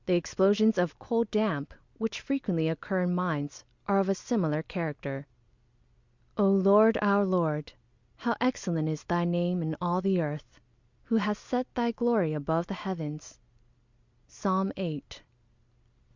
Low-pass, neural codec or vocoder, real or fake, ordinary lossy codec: 7.2 kHz; none; real; Opus, 64 kbps